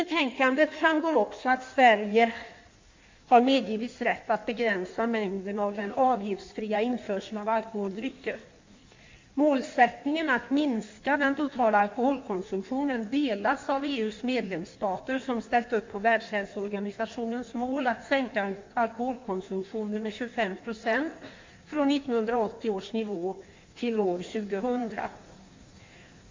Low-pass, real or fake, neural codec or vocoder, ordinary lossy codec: 7.2 kHz; fake; codec, 16 kHz in and 24 kHz out, 1.1 kbps, FireRedTTS-2 codec; MP3, 48 kbps